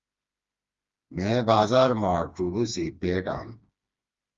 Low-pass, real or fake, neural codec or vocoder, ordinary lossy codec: 7.2 kHz; fake; codec, 16 kHz, 2 kbps, FreqCodec, smaller model; Opus, 32 kbps